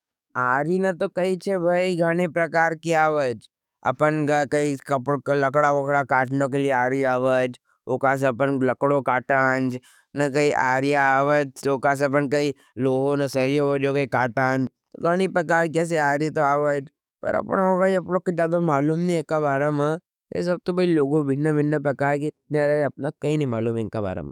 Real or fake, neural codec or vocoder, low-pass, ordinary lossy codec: fake; codec, 44.1 kHz, 7.8 kbps, DAC; 19.8 kHz; none